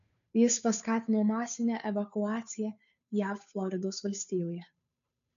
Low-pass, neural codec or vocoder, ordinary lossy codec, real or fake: 7.2 kHz; codec, 16 kHz, 2 kbps, FunCodec, trained on Chinese and English, 25 frames a second; MP3, 96 kbps; fake